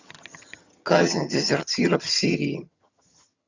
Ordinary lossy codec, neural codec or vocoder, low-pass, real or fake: Opus, 64 kbps; vocoder, 22.05 kHz, 80 mel bands, HiFi-GAN; 7.2 kHz; fake